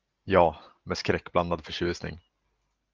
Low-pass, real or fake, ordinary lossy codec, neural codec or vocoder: 7.2 kHz; real; Opus, 32 kbps; none